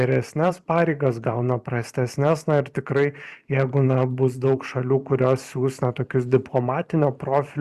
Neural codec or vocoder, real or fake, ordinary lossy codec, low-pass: vocoder, 44.1 kHz, 128 mel bands every 512 samples, BigVGAN v2; fake; Opus, 64 kbps; 14.4 kHz